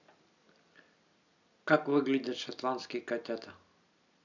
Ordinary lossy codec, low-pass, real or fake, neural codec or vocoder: none; 7.2 kHz; real; none